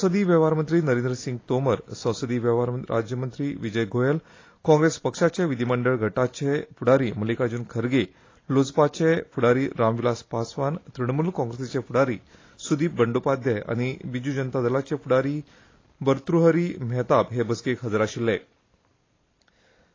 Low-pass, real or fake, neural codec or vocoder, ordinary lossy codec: 7.2 kHz; real; none; AAC, 32 kbps